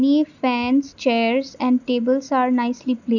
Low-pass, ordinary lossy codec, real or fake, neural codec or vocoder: 7.2 kHz; none; real; none